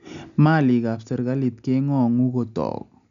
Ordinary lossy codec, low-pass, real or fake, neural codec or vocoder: none; 7.2 kHz; real; none